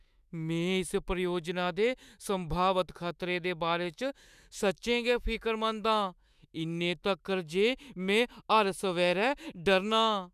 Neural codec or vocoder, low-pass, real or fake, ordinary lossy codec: autoencoder, 48 kHz, 128 numbers a frame, DAC-VAE, trained on Japanese speech; 14.4 kHz; fake; none